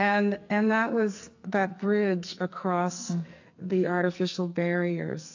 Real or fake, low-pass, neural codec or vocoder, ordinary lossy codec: fake; 7.2 kHz; codec, 44.1 kHz, 2.6 kbps, SNAC; AAC, 48 kbps